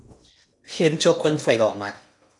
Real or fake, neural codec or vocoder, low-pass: fake; codec, 16 kHz in and 24 kHz out, 0.8 kbps, FocalCodec, streaming, 65536 codes; 10.8 kHz